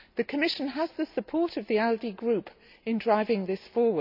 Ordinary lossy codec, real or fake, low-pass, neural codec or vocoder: AAC, 48 kbps; real; 5.4 kHz; none